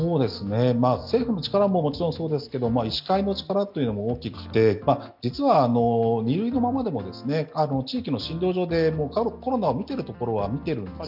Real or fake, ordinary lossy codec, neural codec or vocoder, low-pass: real; none; none; 5.4 kHz